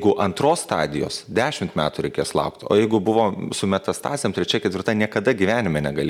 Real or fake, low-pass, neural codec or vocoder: real; 19.8 kHz; none